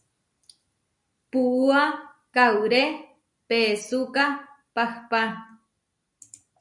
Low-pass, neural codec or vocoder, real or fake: 10.8 kHz; none; real